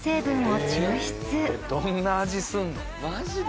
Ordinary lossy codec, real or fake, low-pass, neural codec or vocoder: none; real; none; none